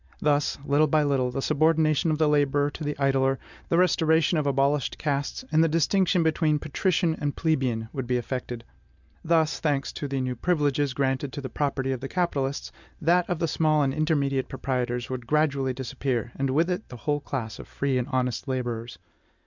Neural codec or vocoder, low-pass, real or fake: none; 7.2 kHz; real